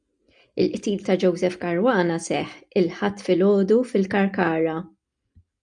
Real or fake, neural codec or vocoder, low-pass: real; none; 9.9 kHz